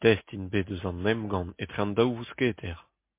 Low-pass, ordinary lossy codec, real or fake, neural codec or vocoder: 3.6 kHz; MP3, 24 kbps; real; none